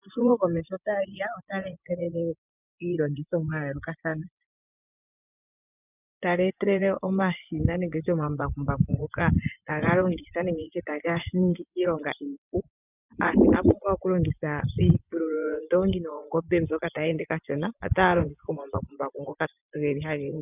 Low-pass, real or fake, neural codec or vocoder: 3.6 kHz; fake; vocoder, 24 kHz, 100 mel bands, Vocos